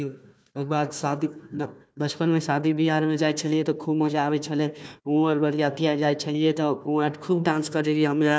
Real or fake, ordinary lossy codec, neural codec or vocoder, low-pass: fake; none; codec, 16 kHz, 1 kbps, FunCodec, trained on Chinese and English, 50 frames a second; none